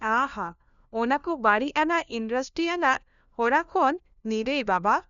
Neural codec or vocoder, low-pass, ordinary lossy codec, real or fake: codec, 16 kHz, 1 kbps, FunCodec, trained on LibriTTS, 50 frames a second; 7.2 kHz; none; fake